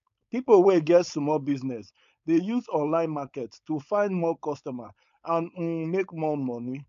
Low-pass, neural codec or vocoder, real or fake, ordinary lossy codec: 7.2 kHz; codec, 16 kHz, 4.8 kbps, FACodec; fake; AAC, 64 kbps